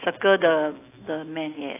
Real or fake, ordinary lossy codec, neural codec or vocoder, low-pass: real; AAC, 24 kbps; none; 3.6 kHz